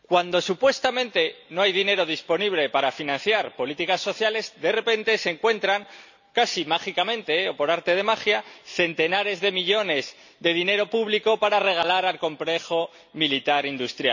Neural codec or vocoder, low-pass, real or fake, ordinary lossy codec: none; 7.2 kHz; real; none